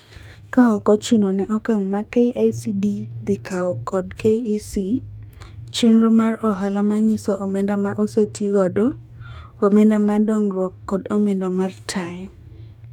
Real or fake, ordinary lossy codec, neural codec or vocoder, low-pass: fake; none; codec, 44.1 kHz, 2.6 kbps, DAC; 19.8 kHz